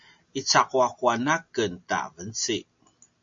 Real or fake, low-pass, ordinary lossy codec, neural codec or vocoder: real; 7.2 kHz; MP3, 48 kbps; none